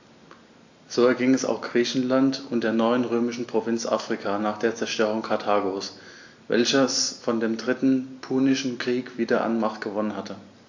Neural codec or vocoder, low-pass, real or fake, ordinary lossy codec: none; 7.2 kHz; real; AAC, 48 kbps